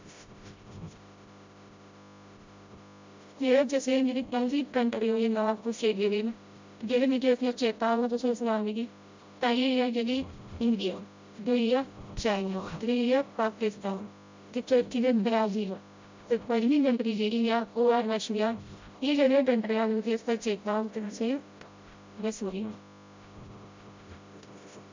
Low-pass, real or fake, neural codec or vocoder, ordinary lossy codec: 7.2 kHz; fake; codec, 16 kHz, 0.5 kbps, FreqCodec, smaller model; MP3, 64 kbps